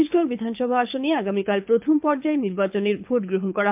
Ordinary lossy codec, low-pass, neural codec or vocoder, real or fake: MP3, 32 kbps; 3.6 kHz; codec, 24 kHz, 6 kbps, HILCodec; fake